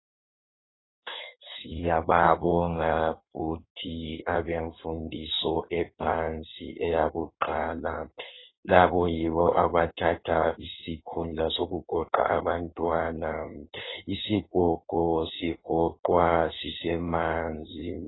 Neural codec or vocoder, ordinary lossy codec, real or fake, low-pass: codec, 16 kHz in and 24 kHz out, 1.1 kbps, FireRedTTS-2 codec; AAC, 16 kbps; fake; 7.2 kHz